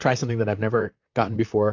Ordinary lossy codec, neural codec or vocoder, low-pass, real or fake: AAC, 48 kbps; vocoder, 44.1 kHz, 128 mel bands, Pupu-Vocoder; 7.2 kHz; fake